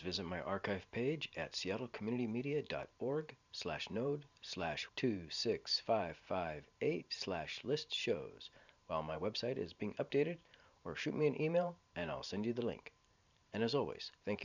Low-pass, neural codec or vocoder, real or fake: 7.2 kHz; vocoder, 44.1 kHz, 128 mel bands every 512 samples, BigVGAN v2; fake